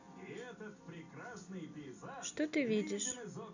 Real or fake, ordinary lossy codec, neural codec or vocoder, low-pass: real; none; none; 7.2 kHz